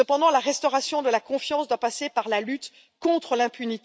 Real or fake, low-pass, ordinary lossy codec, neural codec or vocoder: real; none; none; none